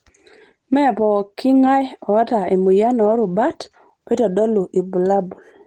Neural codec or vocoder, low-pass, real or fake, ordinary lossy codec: none; 19.8 kHz; real; Opus, 16 kbps